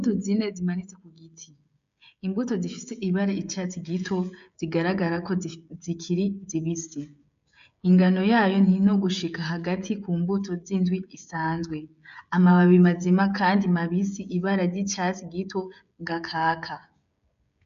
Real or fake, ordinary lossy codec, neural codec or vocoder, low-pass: fake; MP3, 64 kbps; codec, 16 kHz, 16 kbps, FreqCodec, smaller model; 7.2 kHz